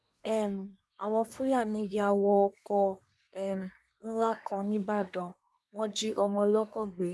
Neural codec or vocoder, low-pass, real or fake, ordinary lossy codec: codec, 24 kHz, 1 kbps, SNAC; none; fake; none